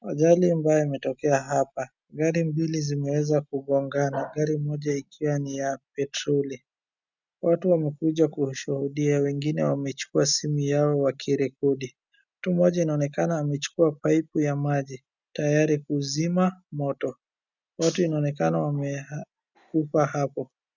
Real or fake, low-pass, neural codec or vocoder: real; 7.2 kHz; none